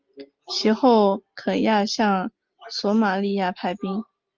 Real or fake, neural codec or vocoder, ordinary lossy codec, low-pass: real; none; Opus, 24 kbps; 7.2 kHz